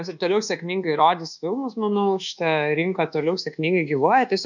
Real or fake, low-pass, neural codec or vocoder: fake; 7.2 kHz; codec, 24 kHz, 1.2 kbps, DualCodec